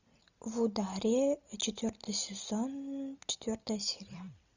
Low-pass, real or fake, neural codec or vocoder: 7.2 kHz; real; none